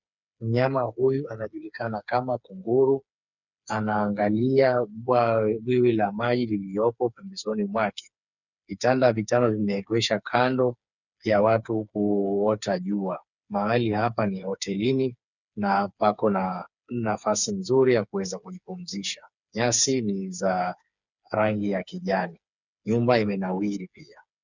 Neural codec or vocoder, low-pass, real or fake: codec, 16 kHz, 4 kbps, FreqCodec, smaller model; 7.2 kHz; fake